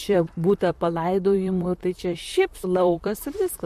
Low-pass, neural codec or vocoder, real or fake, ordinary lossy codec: 14.4 kHz; vocoder, 44.1 kHz, 128 mel bands, Pupu-Vocoder; fake; MP3, 64 kbps